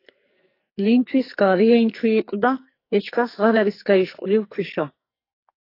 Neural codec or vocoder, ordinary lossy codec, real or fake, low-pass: codec, 44.1 kHz, 2.6 kbps, SNAC; AAC, 32 kbps; fake; 5.4 kHz